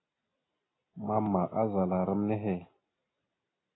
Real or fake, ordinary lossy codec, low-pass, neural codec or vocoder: real; AAC, 16 kbps; 7.2 kHz; none